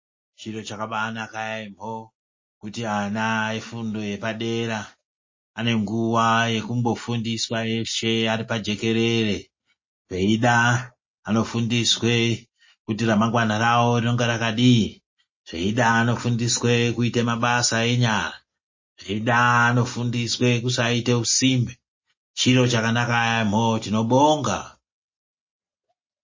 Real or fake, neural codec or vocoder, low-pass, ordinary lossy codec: real; none; 7.2 kHz; MP3, 32 kbps